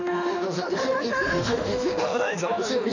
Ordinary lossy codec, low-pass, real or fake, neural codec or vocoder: none; 7.2 kHz; fake; autoencoder, 48 kHz, 32 numbers a frame, DAC-VAE, trained on Japanese speech